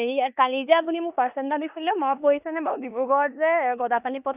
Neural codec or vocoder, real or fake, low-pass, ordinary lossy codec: codec, 16 kHz in and 24 kHz out, 0.9 kbps, LongCat-Audio-Codec, four codebook decoder; fake; 3.6 kHz; none